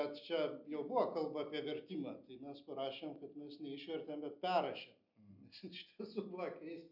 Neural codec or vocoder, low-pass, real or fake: none; 5.4 kHz; real